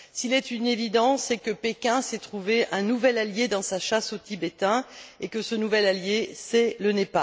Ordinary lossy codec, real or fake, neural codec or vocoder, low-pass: none; real; none; none